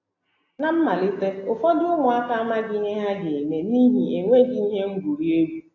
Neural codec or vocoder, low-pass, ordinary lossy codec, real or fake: none; 7.2 kHz; AAC, 32 kbps; real